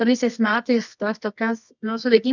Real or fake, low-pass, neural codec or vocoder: fake; 7.2 kHz; codec, 24 kHz, 0.9 kbps, WavTokenizer, medium music audio release